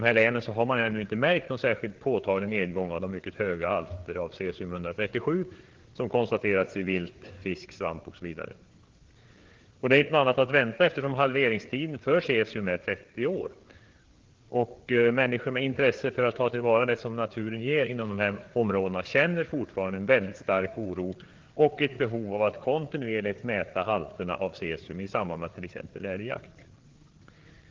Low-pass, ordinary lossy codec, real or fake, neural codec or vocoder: 7.2 kHz; Opus, 16 kbps; fake; codec, 16 kHz, 4 kbps, FreqCodec, larger model